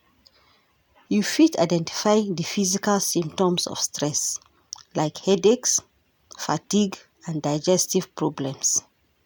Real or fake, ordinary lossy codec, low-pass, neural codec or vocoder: real; none; none; none